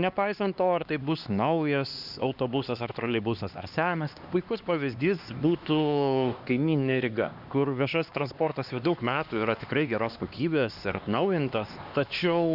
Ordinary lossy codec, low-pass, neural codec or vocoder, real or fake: Opus, 64 kbps; 5.4 kHz; codec, 16 kHz, 2 kbps, X-Codec, HuBERT features, trained on LibriSpeech; fake